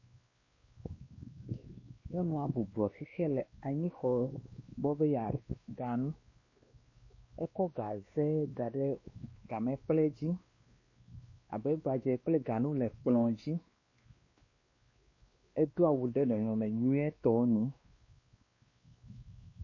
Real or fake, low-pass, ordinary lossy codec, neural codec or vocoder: fake; 7.2 kHz; MP3, 32 kbps; codec, 16 kHz, 2 kbps, X-Codec, WavLM features, trained on Multilingual LibriSpeech